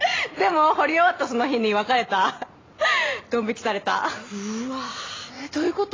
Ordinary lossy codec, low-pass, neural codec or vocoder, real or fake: AAC, 32 kbps; 7.2 kHz; none; real